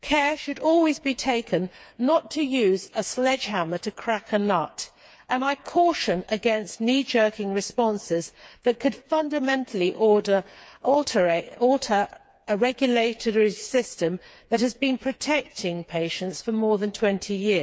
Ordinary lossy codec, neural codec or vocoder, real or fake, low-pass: none; codec, 16 kHz, 4 kbps, FreqCodec, smaller model; fake; none